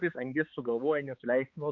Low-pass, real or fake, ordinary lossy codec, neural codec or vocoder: 7.2 kHz; fake; Opus, 64 kbps; codec, 16 kHz, 4 kbps, X-Codec, HuBERT features, trained on balanced general audio